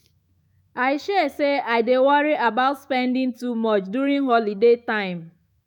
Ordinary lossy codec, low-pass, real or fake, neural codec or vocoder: none; 19.8 kHz; fake; autoencoder, 48 kHz, 128 numbers a frame, DAC-VAE, trained on Japanese speech